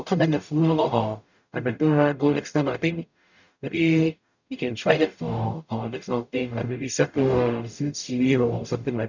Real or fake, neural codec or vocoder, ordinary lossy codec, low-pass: fake; codec, 44.1 kHz, 0.9 kbps, DAC; none; 7.2 kHz